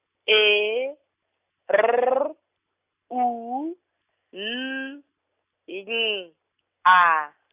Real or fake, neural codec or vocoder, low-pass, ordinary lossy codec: real; none; 3.6 kHz; Opus, 64 kbps